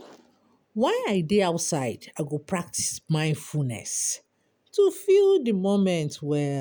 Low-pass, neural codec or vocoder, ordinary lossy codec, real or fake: none; none; none; real